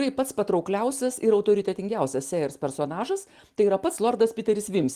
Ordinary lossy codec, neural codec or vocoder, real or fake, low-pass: Opus, 24 kbps; none; real; 14.4 kHz